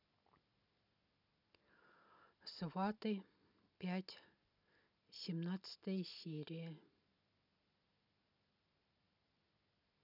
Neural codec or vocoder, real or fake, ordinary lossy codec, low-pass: none; real; none; 5.4 kHz